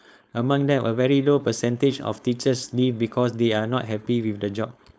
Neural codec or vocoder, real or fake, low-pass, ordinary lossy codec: codec, 16 kHz, 4.8 kbps, FACodec; fake; none; none